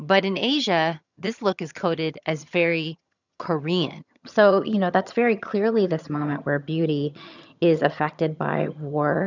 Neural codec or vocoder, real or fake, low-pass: vocoder, 22.05 kHz, 80 mel bands, HiFi-GAN; fake; 7.2 kHz